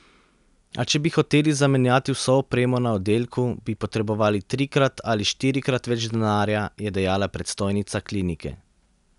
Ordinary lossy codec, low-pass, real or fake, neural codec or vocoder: none; 10.8 kHz; real; none